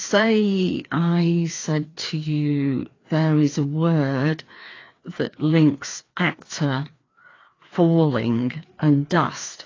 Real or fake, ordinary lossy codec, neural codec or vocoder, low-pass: fake; AAC, 32 kbps; codec, 16 kHz, 2 kbps, FreqCodec, larger model; 7.2 kHz